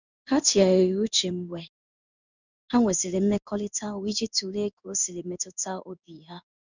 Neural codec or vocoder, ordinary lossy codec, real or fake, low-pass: codec, 16 kHz in and 24 kHz out, 1 kbps, XY-Tokenizer; none; fake; 7.2 kHz